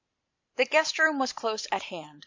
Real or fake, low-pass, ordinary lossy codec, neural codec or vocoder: real; 7.2 kHz; MP3, 64 kbps; none